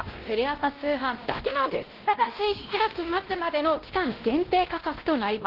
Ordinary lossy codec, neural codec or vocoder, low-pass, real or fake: Opus, 16 kbps; codec, 16 kHz, 1 kbps, X-Codec, WavLM features, trained on Multilingual LibriSpeech; 5.4 kHz; fake